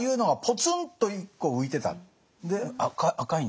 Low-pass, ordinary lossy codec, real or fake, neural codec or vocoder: none; none; real; none